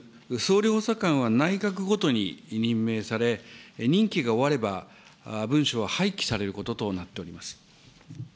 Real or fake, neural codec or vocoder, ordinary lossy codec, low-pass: real; none; none; none